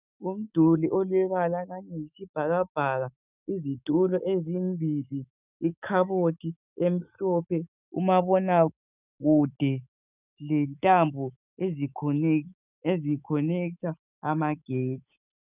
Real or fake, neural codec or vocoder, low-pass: fake; autoencoder, 48 kHz, 128 numbers a frame, DAC-VAE, trained on Japanese speech; 3.6 kHz